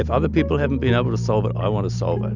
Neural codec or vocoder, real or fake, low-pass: none; real; 7.2 kHz